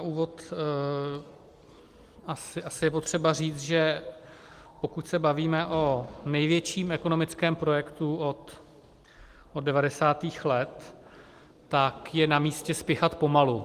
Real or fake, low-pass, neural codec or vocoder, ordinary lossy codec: real; 14.4 kHz; none; Opus, 24 kbps